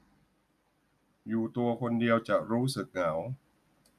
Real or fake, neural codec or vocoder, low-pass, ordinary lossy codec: real; none; 14.4 kHz; none